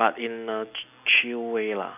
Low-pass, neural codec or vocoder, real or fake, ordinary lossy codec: 3.6 kHz; none; real; none